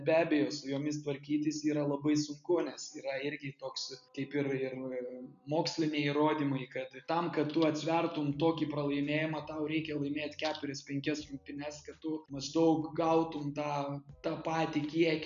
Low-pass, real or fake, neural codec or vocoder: 7.2 kHz; real; none